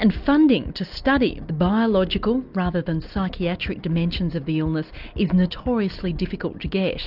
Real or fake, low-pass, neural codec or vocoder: real; 5.4 kHz; none